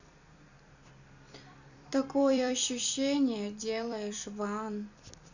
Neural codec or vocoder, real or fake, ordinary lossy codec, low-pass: vocoder, 44.1 kHz, 128 mel bands every 256 samples, BigVGAN v2; fake; none; 7.2 kHz